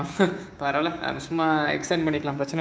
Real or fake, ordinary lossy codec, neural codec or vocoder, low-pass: fake; none; codec, 16 kHz, 6 kbps, DAC; none